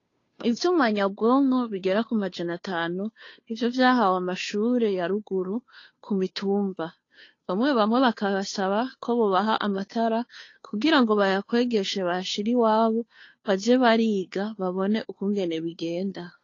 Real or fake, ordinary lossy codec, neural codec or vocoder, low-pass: fake; AAC, 32 kbps; codec, 16 kHz, 2 kbps, FunCodec, trained on Chinese and English, 25 frames a second; 7.2 kHz